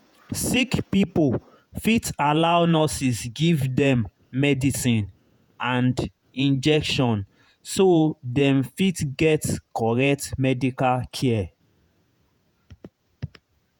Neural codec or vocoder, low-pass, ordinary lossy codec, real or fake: vocoder, 48 kHz, 128 mel bands, Vocos; 19.8 kHz; none; fake